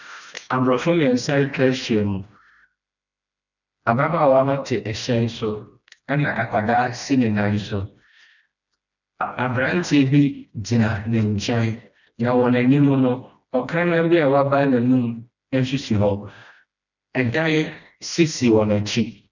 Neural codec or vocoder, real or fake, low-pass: codec, 16 kHz, 1 kbps, FreqCodec, smaller model; fake; 7.2 kHz